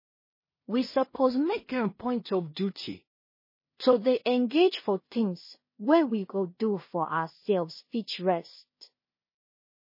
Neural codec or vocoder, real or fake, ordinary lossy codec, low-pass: codec, 16 kHz in and 24 kHz out, 0.4 kbps, LongCat-Audio-Codec, two codebook decoder; fake; MP3, 24 kbps; 5.4 kHz